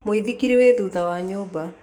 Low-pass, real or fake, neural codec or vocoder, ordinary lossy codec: 19.8 kHz; fake; codec, 44.1 kHz, 7.8 kbps, DAC; none